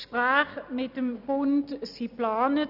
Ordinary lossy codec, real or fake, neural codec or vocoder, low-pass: none; real; none; 5.4 kHz